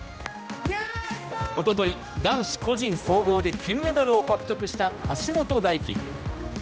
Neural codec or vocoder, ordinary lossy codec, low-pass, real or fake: codec, 16 kHz, 1 kbps, X-Codec, HuBERT features, trained on general audio; none; none; fake